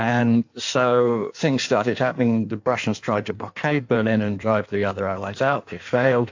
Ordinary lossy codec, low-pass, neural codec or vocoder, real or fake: AAC, 48 kbps; 7.2 kHz; codec, 16 kHz in and 24 kHz out, 1.1 kbps, FireRedTTS-2 codec; fake